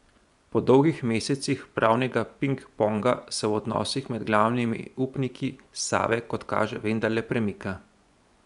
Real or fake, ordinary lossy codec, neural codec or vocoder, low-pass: fake; none; vocoder, 24 kHz, 100 mel bands, Vocos; 10.8 kHz